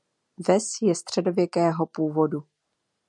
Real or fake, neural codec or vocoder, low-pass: real; none; 10.8 kHz